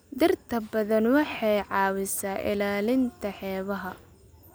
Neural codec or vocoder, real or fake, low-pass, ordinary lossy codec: none; real; none; none